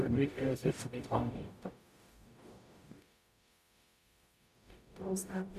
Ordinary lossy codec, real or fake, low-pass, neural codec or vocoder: none; fake; 14.4 kHz; codec, 44.1 kHz, 0.9 kbps, DAC